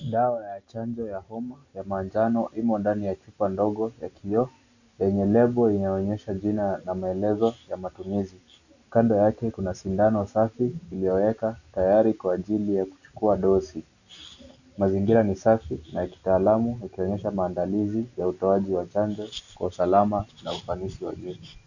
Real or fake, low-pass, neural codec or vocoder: real; 7.2 kHz; none